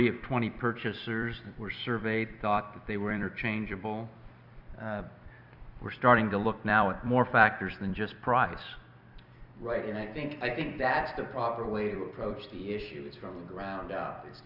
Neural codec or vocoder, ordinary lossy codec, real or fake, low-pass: vocoder, 44.1 kHz, 128 mel bands every 256 samples, BigVGAN v2; Opus, 64 kbps; fake; 5.4 kHz